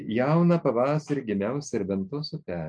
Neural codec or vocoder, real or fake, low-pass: none; real; 9.9 kHz